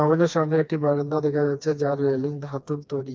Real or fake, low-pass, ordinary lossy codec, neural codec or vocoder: fake; none; none; codec, 16 kHz, 2 kbps, FreqCodec, smaller model